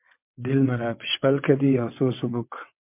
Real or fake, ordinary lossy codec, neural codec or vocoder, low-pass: fake; MP3, 32 kbps; vocoder, 22.05 kHz, 80 mel bands, WaveNeXt; 3.6 kHz